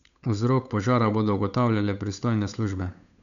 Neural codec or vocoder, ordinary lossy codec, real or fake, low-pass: codec, 16 kHz, 8 kbps, FunCodec, trained on Chinese and English, 25 frames a second; none; fake; 7.2 kHz